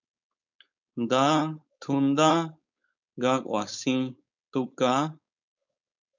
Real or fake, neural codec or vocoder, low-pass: fake; codec, 16 kHz, 4.8 kbps, FACodec; 7.2 kHz